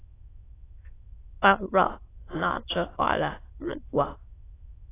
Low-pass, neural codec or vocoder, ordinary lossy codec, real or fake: 3.6 kHz; autoencoder, 22.05 kHz, a latent of 192 numbers a frame, VITS, trained on many speakers; AAC, 16 kbps; fake